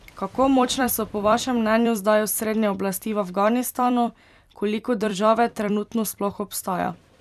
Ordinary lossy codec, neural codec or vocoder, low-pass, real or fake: none; vocoder, 44.1 kHz, 128 mel bands every 256 samples, BigVGAN v2; 14.4 kHz; fake